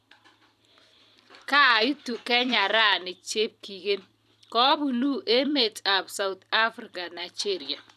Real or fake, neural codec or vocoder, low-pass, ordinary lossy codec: fake; vocoder, 44.1 kHz, 128 mel bands every 256 samples, BigVGAN v2; 14.4 kHz; none